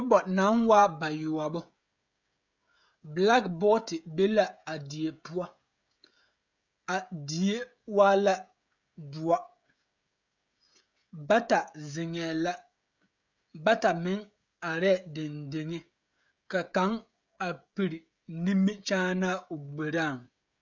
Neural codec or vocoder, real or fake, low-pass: codec, 16 kHz, 16 kbps, FreqCodec, smaller model; fake; 7.2 kHz